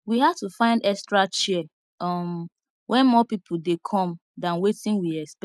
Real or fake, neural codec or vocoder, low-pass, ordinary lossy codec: real; none; none; none